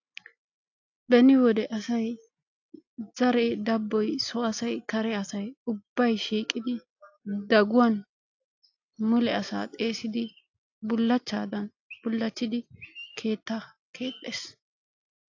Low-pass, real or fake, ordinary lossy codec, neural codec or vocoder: 7.2 kHz; real; AAC, 48 kbps; none